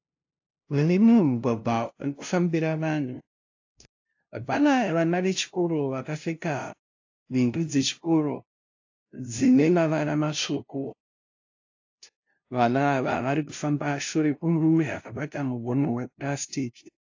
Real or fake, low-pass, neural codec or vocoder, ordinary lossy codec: fake; 7.2 kHz; codec, 16 kHz, 0.5 kbps, FunCodec, trained on LibriTTS, 25 frames a second; AAC, 48 kbps